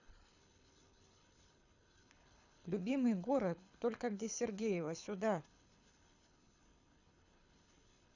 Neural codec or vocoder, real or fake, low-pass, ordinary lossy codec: codec, 24 kHz, 6 kbps, HILCodec; fake; 7.2 kHz; none